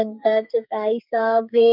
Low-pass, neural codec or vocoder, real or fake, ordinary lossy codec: 5.4 kHz; codec, 44.1 kHz, 2.6 kbps, SNAC; fake; none